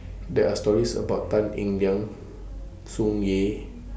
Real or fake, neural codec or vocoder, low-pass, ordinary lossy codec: real; none; none; none